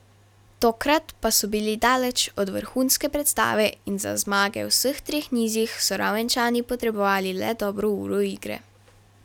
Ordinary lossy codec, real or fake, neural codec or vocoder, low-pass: none; real; none; 19.8 kHz